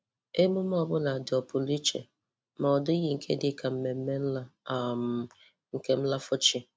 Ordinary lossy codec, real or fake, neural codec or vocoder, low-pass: none; real; none; none